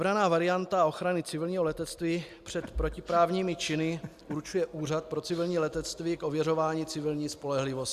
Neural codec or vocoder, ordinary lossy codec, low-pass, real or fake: none; Opus, 64 kbps; 14.4 kHz; real